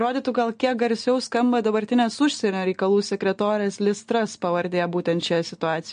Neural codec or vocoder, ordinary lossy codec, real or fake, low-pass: none; MP3, 48 kbps; real; 9.9 kHz